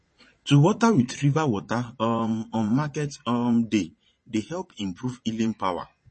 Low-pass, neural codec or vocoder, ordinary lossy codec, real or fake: 9.9 kHz; vocoder, 22.05 kHz, 80 mel bands, Vocos; MP3, 32 kbps; fake